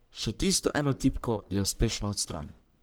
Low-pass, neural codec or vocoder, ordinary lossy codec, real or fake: none; codec, 44.1 kHz, 1.7 kbps, Pupu-Codec; none; fake